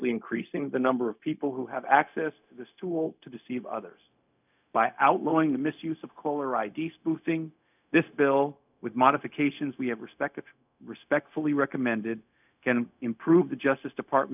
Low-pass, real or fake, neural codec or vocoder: 3.6 kHz; fake; codec, 16 kHz, 0.4 kbps, LongCat-Audio-Codec